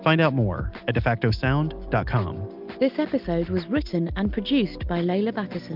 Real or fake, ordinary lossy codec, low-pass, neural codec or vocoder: real; Opus, 24 kbps; 5.4 kHz; none